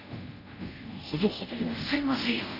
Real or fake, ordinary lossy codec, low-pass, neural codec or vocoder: fake; none; 5.4 kHz; codec, 24 kHz, 0.5 kbps, DualCodec